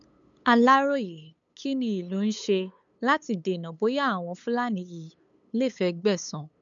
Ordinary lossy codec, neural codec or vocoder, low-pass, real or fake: none; codec, 16 kHz, 8 kbps, FunCodec, trained on LibriTTS, 25 frames a second; 7.2 kHz; fake